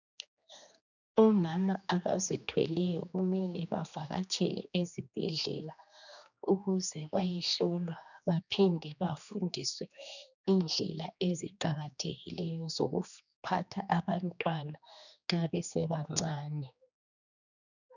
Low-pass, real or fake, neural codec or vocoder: 7.2 kHz; fake; codec, 16 kHz, 2 kbps, X-Codec, HuBERT features, trained on general audio